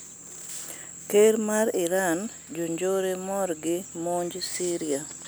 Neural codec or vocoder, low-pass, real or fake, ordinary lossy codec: none; none; real; none